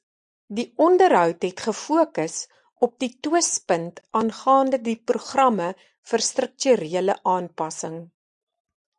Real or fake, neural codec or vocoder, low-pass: real; none; 9.9 kHz